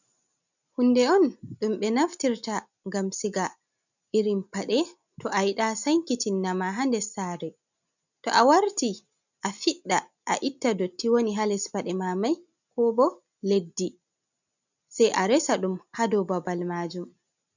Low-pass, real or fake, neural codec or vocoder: 7.2 kHz; real; none